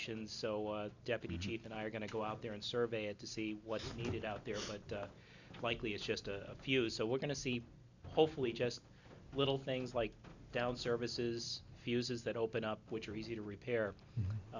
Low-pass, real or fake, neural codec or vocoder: 7.2 kHz; real; none